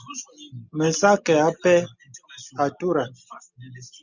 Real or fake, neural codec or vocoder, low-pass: real; none; 7.2 kHz